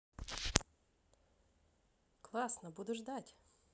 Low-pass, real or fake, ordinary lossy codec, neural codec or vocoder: none; real; none; none